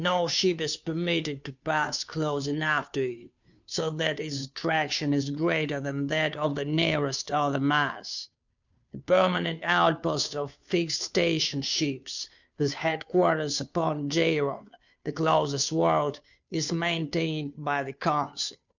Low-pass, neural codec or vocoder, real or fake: 7.2 kHz; codec, 16 kHz, 2 kbps, FunCodec, trained on Chinese and English, 25 frames a second; fake